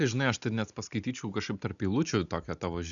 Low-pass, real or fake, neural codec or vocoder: 7.2 kHz; real; none